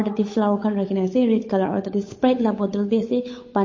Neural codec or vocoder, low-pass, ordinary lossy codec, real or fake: codec, 16 kHz, 8 kbps, FunCodec, trained on Chinese and English, 25 frames a second; 7.2 kHz; MP3, 32 kbps; fake